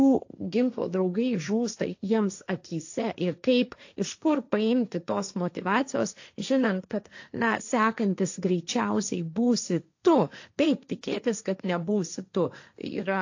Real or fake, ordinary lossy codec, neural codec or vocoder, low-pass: fake; AAC, 48 kbps; codec, 16 kHz, 1.1 kbps, Voila-Tokenizer; 7.2 kHz